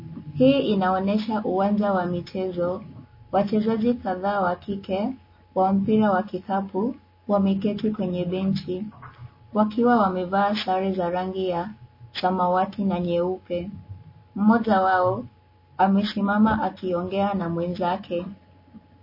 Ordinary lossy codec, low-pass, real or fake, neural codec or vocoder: MP3, 24 kbps; 5.4 kHz; real; none